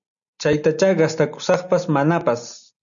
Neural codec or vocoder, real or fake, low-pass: none; real; 7.2 kHz